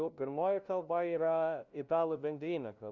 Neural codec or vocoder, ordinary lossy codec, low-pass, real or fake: codec, 16 kHz, 0.5 kbps, FunCodec, trained on LibriTTS, 25 frames a second; Opus, 64 kbps; 7.2 kHz; fake